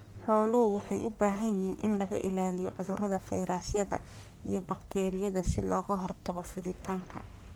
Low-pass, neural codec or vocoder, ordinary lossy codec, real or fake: none; codec, 44.1 kHz, 1.7 kbps, Pupu-Codec; none; fake